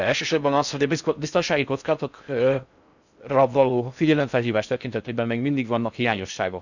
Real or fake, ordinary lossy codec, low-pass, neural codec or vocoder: fake; none; 7.2 kHz; codec, 16 kHz in and 24 kHz out, 0.6 kbps, FocalCodec, streaming, 4096 codes